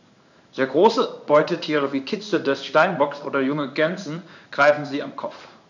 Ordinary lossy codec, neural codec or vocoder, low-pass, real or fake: none; codec, 16 kHz in and 24 kHz out, 1 kbps, XY-Tokenizer; 7.2 kHz; fake